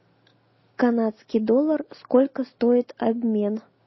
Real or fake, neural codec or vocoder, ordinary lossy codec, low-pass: real; none; MP3, 24 kbps; 7.2 kHz